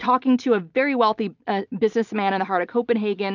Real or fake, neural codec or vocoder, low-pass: real; none; 7.2 kHz